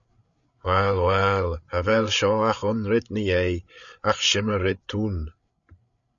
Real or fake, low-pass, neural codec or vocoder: fake; 7.2 kHz; codec, 16 kHz, 8 kbps, FreqCodec, larger model